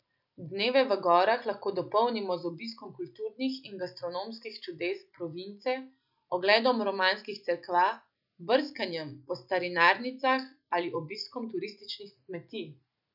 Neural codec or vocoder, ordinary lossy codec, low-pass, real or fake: none; none; 5.4 kHz; real